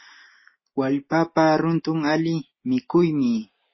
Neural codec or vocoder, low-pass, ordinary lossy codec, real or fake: none; 7.2 kHz; MP3, 24 kbps; real